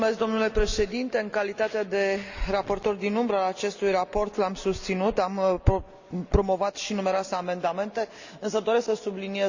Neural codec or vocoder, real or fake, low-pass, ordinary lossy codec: none; real; 7.2 kHz; Opus, 64 kbps